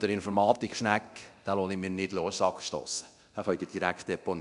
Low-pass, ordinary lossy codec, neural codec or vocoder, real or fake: 10.8 kHz; none; codec, 24 kHz, 0.9 kbps, DualCodec; fake